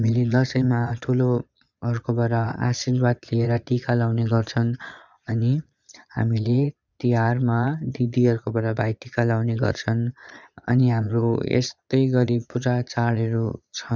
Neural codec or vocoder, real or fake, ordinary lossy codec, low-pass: vocoder, 22.05 kHz, 80 mel bands, Vocos; fake; none; 7.2 kHz